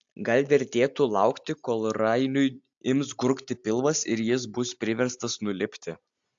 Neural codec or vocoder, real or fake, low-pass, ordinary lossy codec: none; real; 7.2 kHz; AAC, 64 kbps